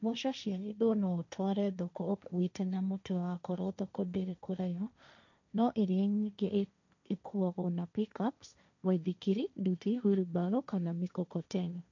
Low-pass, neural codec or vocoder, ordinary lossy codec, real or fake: 7.2 kHz; codec, 16 kHz, 1.1 kbps, Voila-Tokenizer; none; fake